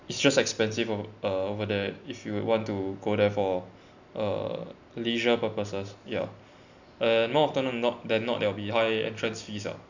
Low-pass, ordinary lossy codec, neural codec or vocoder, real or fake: 7.2 kHz; none; none; real